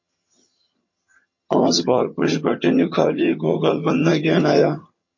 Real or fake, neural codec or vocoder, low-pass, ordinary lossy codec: fake; vocoder, 22.05 kHz, 80 mel bands, HiFi-GAN; 7.2 kHz; MP3, 32 kbps